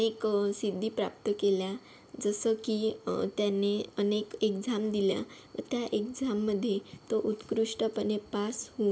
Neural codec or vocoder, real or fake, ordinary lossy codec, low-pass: none; real; none; none